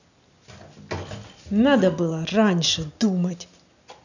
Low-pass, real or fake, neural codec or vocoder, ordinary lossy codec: 7.2 kHz; real; none; none